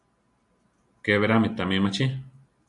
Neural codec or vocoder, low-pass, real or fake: none; 10.8 kHz; real